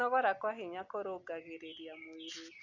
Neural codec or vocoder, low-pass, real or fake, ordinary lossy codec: none; 7.2 kHz; real; none